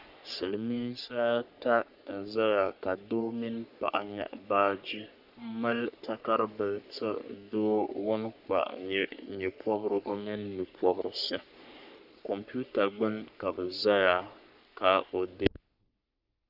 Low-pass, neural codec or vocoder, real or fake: 5.4 kHz; codec, 44.1 kHz, 3.4 kbps, Pupu-Codec; fake